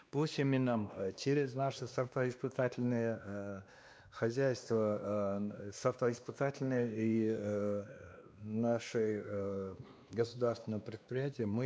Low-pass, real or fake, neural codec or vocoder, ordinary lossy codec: none; fake; codec, 16 kHz, 2 kbps, X-Codec, WavLM features, trained on Multilingual LibriSpeech; none